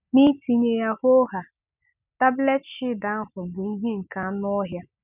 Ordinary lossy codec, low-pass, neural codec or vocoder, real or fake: none; 3.6 kHz; none; real